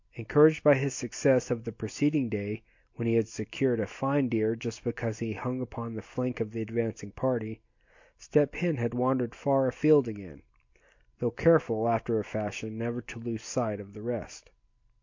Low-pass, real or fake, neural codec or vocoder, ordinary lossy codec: 7.2 kHz; real; none; MP3, 48 kbps